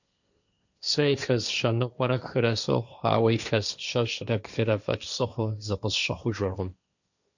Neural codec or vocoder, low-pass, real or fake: codec, 16 kHz, 1.1 kbps, Voila-Tokenizer; 7.2 kHz; fake